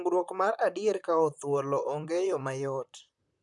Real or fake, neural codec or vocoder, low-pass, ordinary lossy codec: fake; vocoder, 44.1 kHz, 128 mel bands, Pupu-Vocoder; 10.8 kHz; MP3, 96 kbps